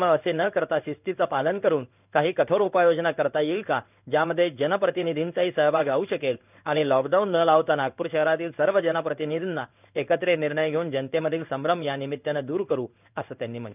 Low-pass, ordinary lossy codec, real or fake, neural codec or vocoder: 3.6 kHz; none; fake; codec, 16 kHz in and 24 kHz out, 1 kbps, XY-Tokenizer